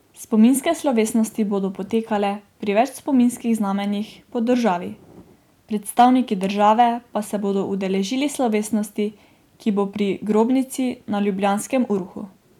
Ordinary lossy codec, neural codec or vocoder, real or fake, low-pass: none; none; real; 19.8 kHz